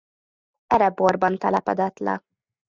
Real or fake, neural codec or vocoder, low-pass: real; none; 7.2 kHz